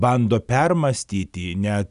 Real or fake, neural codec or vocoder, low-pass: real; none; 10.8 kHz